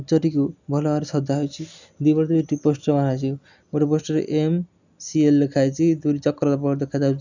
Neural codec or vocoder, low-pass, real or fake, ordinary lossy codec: none; 7.2 kHz; real; none